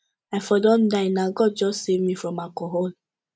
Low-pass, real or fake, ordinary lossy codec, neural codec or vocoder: none; real; none; none